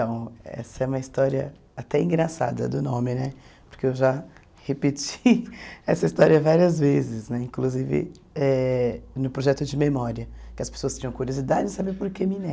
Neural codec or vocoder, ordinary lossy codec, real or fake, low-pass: none; none; real; none